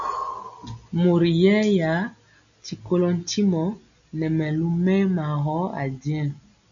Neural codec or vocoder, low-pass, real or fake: none; 7.2 kHz; real